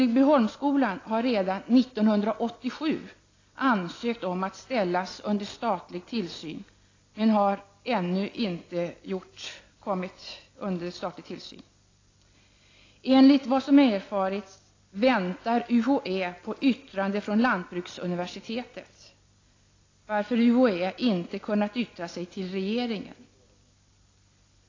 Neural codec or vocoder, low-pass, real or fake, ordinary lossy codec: none; 7.2 kHz; real; AAC, 32 kbps